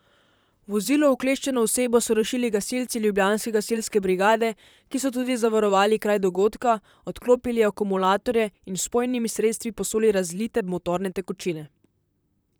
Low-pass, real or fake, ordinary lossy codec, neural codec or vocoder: none; fake; none; vocoder, 44.1 kHz, 128 mel bands, Pupu-Vocoder